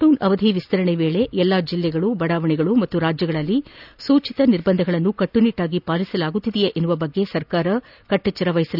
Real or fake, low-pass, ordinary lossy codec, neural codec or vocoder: real; 5.4 kHz; none; none